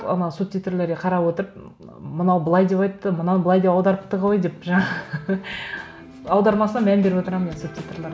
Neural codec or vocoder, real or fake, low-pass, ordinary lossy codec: none; real; none; none